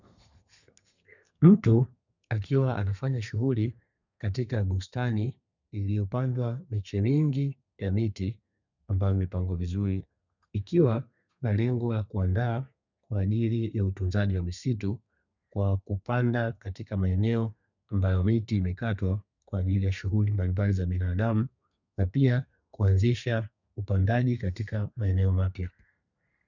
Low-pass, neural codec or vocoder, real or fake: 7.2 kHz; codec, 32 kHz, 1.9 kbps, SNAC; fake